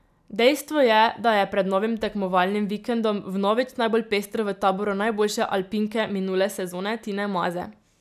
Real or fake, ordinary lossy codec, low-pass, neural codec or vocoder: real; none; 14.4 kHz; none